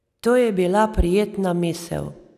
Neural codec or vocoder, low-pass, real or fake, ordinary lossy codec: none; 14.4 kHz; real; none